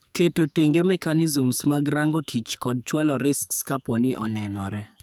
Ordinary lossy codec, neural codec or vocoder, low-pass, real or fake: none; codec, 44.1 kHz, 2.6 kbps, SNAC; none; fake